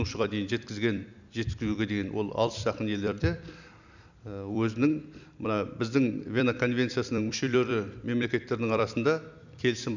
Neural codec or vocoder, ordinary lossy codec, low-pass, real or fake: vocoder, 44.1 kHz, 128 mel bands every 256 samples, BigVGAN v2; none; 7.2 kHz; fake